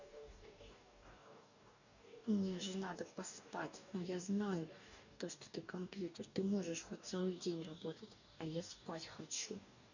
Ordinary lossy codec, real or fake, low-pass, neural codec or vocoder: none; fake; 7.2 kHz; codec, 44.1 kHz, 2.6 kbps, DAC